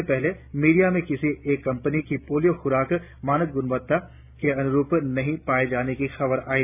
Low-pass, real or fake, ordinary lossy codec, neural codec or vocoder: 3.6 kHz; real; none; none